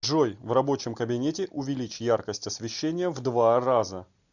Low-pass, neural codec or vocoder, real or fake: 7.2 kHz; none; real